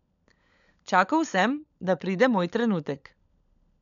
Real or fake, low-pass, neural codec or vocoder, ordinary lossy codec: fake; 7.2 kHz; codec, 16 kHz, 16 kbps, FunCodec, trained on LibriTTS, 50 frames a second; none